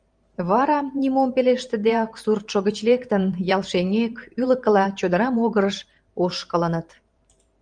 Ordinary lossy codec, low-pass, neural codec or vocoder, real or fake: Opus, 32 kbps; 9.9 kHz; vocoder, 44.1 kHz, 128 mel bands every 512 samples, BigVGAN v2; fake